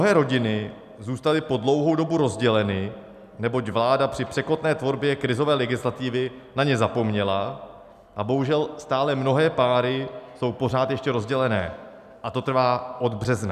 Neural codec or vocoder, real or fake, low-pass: none; real; 14.4 kHz